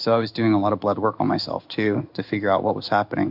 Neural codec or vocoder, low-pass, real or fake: codec, 16 kHz in and 24 kHz out, 1 kbps, XY-Tokenizer; 5.4 kHz; fake